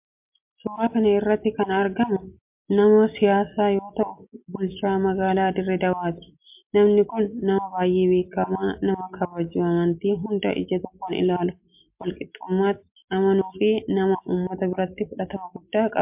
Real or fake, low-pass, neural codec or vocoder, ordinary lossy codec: real; 3.6 kHz; none; MP3, 32 kbps